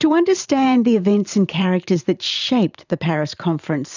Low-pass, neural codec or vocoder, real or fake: 7.2 kHz; vocoder, 44.1 kHz, 128 mel bands every 512 samples, BigVGAN v2; fake